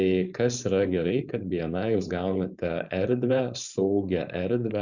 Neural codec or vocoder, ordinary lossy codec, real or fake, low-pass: codec, 16 kHz, 4.8 kbps, FACodec; Opus, 64 kbps; fake; 7.2 kHz